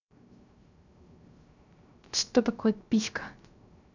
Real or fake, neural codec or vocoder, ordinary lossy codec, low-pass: fake; codec, 16 kHz, 0.3 kbps, FocalCodec; none; 7.2 kHz